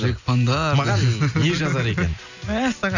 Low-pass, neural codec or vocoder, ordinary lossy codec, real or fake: 7.2 kHz; none; none; real